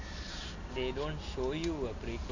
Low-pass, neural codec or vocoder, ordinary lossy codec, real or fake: 7.2 kHz; none; none; real